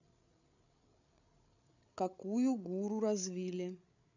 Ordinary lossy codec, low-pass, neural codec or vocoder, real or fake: none; 7.2 kHz; codec, 16 kHz, 8 kbps, FreqCodec, larger model; fake